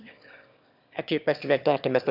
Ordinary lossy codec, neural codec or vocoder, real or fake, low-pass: none; autoencoder, 22.05 kHz, a latent of 192 numbers a frame, VITS, trained on one speaker; fake; 5.4 kHz